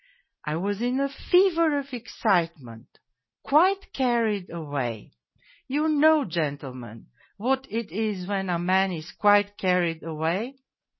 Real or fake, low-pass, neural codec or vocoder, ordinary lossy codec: real; 7.2 kHz; none; MP3, 24 kbps